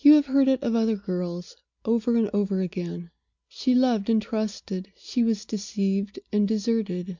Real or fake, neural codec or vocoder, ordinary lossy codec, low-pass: real; none; MP3, 64 kbps; 7.2 kHz